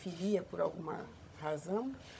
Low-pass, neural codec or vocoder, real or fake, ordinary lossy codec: none; codec, 16 kHz, 16 kbps, FunCodec, trained on Chinese and English, 50 frames a second; fake; none